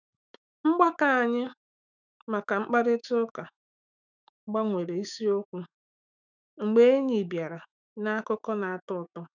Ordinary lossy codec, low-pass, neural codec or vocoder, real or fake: none; 7.2 kHz; autoencoder, 48 kHz, 128 numbers a frame, DAC-VAE, trained on Japanese speech; fake